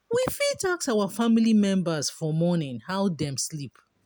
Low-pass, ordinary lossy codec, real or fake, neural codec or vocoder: none; none; real; none